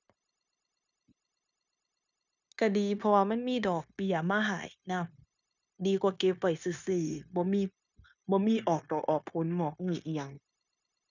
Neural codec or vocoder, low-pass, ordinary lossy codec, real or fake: codec, 16 kHz, 0.9 kbps, LongCat-Audio-Codec; 7.2 kHz; none; fake